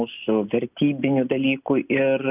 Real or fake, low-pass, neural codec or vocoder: real; 3.6 kHz; none